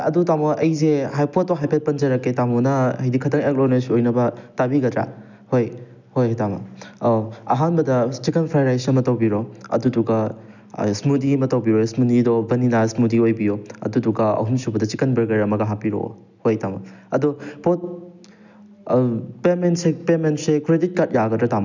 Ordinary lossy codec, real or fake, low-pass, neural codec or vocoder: none; real; 7.2 kHz; none